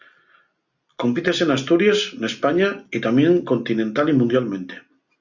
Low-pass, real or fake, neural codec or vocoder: 7.2 kHz; real; none